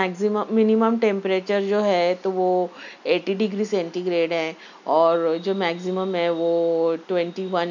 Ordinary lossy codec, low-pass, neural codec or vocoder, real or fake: none; 7.2 kHz; none; real